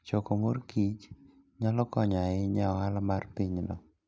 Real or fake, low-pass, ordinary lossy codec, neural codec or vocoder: real; none; none; none